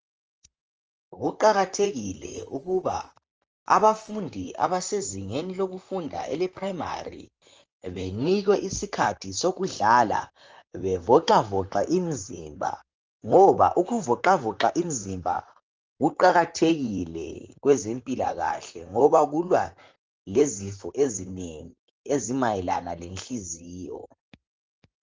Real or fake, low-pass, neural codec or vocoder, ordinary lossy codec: fake; 7.2 kHz; vocoder, 44.1 kHz, 128 mel bands, Pupu-Vocoder; Opus, 24 kbps